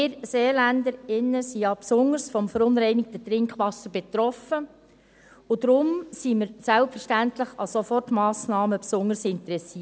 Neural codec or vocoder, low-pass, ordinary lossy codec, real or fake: none; none; none; real